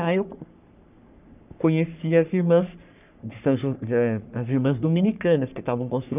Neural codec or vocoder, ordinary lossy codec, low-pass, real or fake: codec, 44.1 kHz, 3.4 kbps, Pupu-Codec; none; 3.6 kHz; fake